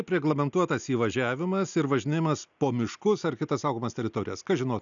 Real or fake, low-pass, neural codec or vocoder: real; 7.2 kHz; none